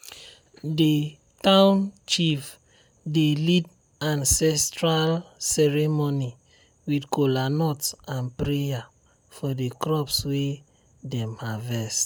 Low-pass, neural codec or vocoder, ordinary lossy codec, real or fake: none; none; none; real